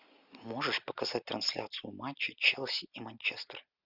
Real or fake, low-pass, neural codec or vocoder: real; 5.4 kHz; none